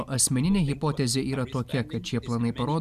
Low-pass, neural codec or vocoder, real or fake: 14.4 kHz; none; real